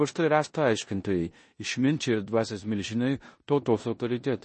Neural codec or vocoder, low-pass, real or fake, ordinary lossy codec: codec, 16 kHz in and 24 kHz out, 0.9 kbps, LongCat-Audio-Codec, four codebook decoder; 10.8 kHz; fake; MP3, 32 kbps